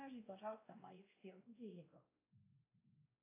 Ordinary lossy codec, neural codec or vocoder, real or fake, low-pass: AAC, 16 kbps; codec, 16 kHz, 1 kbps, X-Codec, WavLM features, trained on Multilingual LibriSpeech; fake; 3.6 kHz